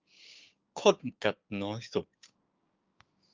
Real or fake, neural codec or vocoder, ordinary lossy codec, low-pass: fake; codec, 16 kHz, 6 kbps, DAC; Opus, 24 kbps; 7.2 kHz